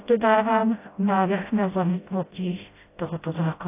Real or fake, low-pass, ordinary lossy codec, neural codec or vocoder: fake; 3.6 kHz; AAC, 24 kbps; codec, 16 kHz, 0.5 kbps, FreqCodec, smaller model